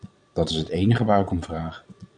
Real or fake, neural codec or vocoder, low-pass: fake; vocoder, 22.05 kHz, 80 mel bands, Vocos; 9.9 kHz